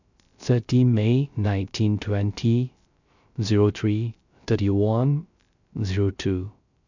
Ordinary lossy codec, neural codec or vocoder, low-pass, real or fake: none; codec, 16 kHz, 0.3 kbps, FocalCodec; 7.2 kHz; fake